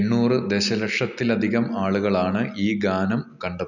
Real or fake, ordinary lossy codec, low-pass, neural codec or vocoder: real; none; 7.2 kHz; none